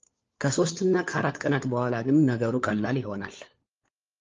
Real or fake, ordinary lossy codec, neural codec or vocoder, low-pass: fake; Opus, 32 kbps; codec, 16 kHz, 4 kbps, FunCodec, trained on LibriTTS, 50 frames a second; 7.2 kHz